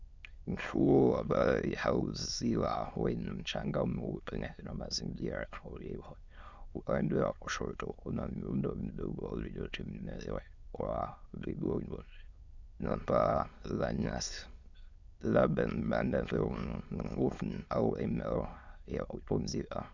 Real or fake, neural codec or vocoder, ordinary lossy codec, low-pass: fake; autoencoder, 22.05 kHz, a latent of 192 numbers a frame, VITS, trained on many speakers; Opus, 64 kbps; 7.2 kHz